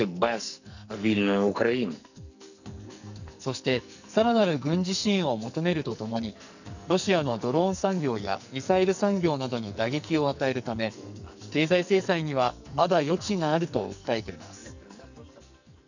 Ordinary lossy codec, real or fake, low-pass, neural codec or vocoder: none; fake; 7.2 kHz; codec, 44.1 kHz, 2.6 kbps, SNAC